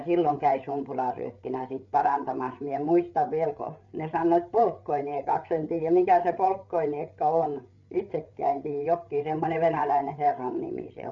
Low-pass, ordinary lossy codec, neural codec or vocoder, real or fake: 7.2 kHz; none; codec, 16 kHz, 8 kbps, FreqCodec, larger model; fake